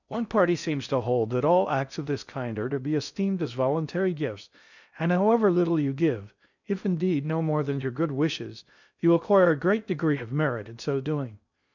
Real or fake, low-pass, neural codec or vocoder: fake; 7.2 kHz; codec, 16 kHz in and 24 kHz out, 0.6 kbps, FocalCodec, streaming, 4096 codes